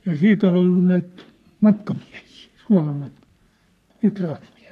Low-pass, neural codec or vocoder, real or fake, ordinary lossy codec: 14.4 kHz; codec, 44.1 kHz, 3.4 kbps, Pupu-Codec; fake; none